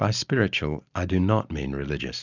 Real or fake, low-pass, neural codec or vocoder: real; 7.2 kHz; none